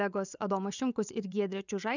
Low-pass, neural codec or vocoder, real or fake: 7.2 kHz; vocoder, 24 kHz, 100 mel bands, Vocos; fake